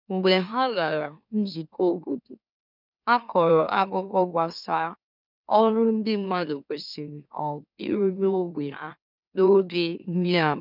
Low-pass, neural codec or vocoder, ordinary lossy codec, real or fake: 5.4 kHz; autoencoder, 44.1 kHz, a latent of 192 numbers a frame, MeloTTS; none; fake